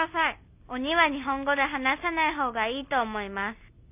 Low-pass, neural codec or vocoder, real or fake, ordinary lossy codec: 3.6 kHz; none; real; none